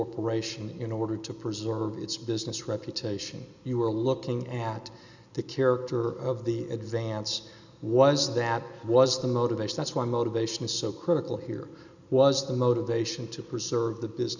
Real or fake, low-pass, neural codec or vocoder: real; 7.2 kHz; none